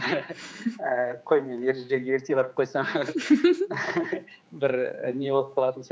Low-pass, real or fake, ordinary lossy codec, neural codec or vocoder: none; fake; none; codec, 16 kHz, 4 kbps, X-Codec, HuBERT features, trained on general audio